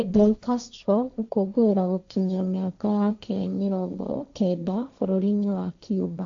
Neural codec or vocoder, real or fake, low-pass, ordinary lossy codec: codec, 16 kHz, 1.1 kbps, Voila-Tokenizer; fake; 7.2 kHz; AAC, 64 kbps